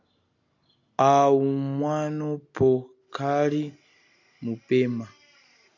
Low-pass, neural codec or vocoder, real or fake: 7.2 kHz; none; real